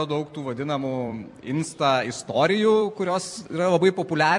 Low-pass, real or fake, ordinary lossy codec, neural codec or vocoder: 10.8 kHz; fake; MP3, 64 kbps; vocoder, 44.1 kHz, 128 mel bands every 256 samples, BigVGAN v2